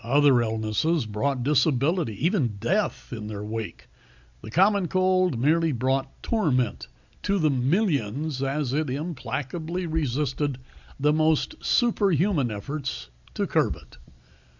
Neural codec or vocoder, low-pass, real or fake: none; 7.2 kHz; real